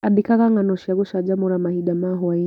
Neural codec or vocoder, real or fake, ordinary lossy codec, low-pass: none; real; none; 19.8 kHz